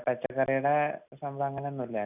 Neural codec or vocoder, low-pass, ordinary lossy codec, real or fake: none; 3.6 kHz; none; real